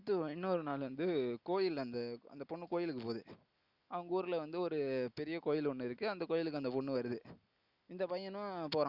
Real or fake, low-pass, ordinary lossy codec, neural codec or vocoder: real; 5.4 kHz; Opus, 64 kbps; none